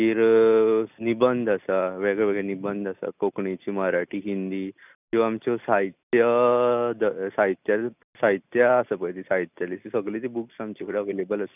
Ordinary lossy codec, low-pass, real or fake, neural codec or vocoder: none; 3.6 kHz; real; none